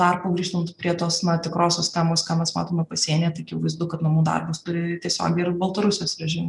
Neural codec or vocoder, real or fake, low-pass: none; real; 10.8 kHz